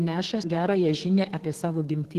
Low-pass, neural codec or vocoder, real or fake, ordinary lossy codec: 14.4 kHz; codec, 32 kHz, 1.9 kbps, SNAC; fake; Opus, 16 kbps